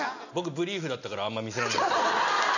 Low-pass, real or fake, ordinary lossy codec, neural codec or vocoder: 7.2 kHz; real; none; none